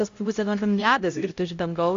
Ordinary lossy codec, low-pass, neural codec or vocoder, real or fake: AAC, 48 kbps; 7.2 kHz; codec, 16 kHz, 0.5 kbps, X-Codec, HuBERT features, trained on LibriSpeech; fake